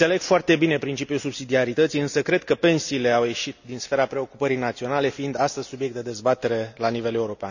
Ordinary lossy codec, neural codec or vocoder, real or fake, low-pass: none; none; real; 7.2 kHz